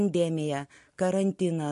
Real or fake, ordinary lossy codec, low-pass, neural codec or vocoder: real; MP3, 48 kbps; 14.4 kHz; none